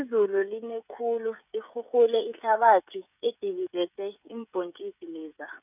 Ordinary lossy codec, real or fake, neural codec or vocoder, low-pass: none; fake; codec, 16 kHz, 16 kbps, FreqCodec, smaller model; 3.6 kHz